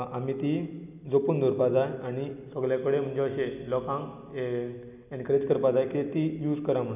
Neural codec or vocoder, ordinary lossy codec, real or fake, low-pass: none; none; real; 3.6 kHz